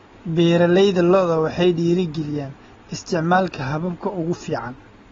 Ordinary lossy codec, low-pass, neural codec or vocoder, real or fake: AAC, 24 kbps; 7.2 kHz; none; real